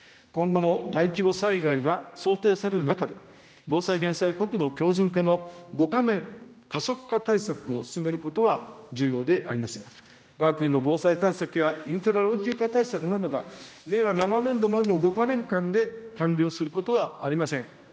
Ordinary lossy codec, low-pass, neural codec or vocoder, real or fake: none; none; codec, 16 kHz, 1 kbps, X-Codec, HuBERT features, trained on general audio; fake